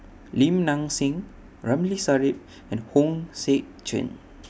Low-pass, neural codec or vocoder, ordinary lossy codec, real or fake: none; none; none; real